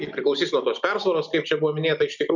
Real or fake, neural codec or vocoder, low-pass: real; none; 7.2 kHz